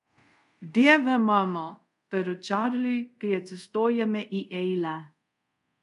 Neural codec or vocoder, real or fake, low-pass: codec, 24 kHz, 0.5 kbps, DualCodec; fake; 10.8 kHz